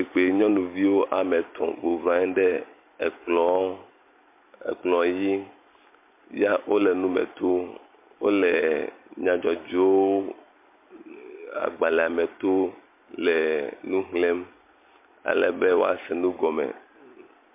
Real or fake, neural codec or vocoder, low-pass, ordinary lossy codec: real; none; 3.6 kHz; MP3, 24 kbps